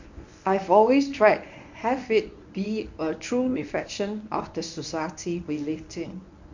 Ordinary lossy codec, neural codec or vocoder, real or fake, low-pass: none; codec, 24 kHz, 0.9 kbps, WavTokenizer, medium speech release version 1; fake; 7.2 kHz